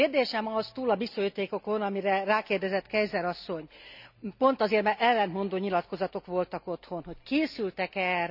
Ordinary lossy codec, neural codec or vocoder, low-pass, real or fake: none; none; 5.4 kHz; real